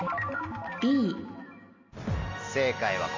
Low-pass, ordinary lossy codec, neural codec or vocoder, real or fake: 7.2 kHz; MP3, 48 kbps; none; real